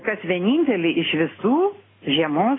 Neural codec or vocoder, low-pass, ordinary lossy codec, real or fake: none; 7.2 kHz; AAC, 16 kbps; real